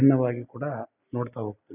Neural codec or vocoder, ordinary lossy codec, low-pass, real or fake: none; none; 3.6 kHz; real